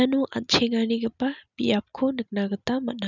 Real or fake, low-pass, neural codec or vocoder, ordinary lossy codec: real; 7.2 kHz; none; none